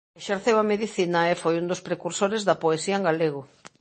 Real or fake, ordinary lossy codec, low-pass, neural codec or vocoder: real; MP3, 32 kbps; 10.8 kHz; none